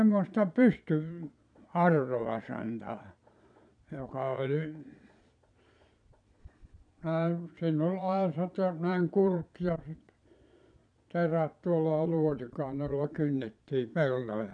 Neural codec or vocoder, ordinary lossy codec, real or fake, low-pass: vocoder, 22.05 kHz, 80 mel bands, Vocos; none; fake; 9.9 kHz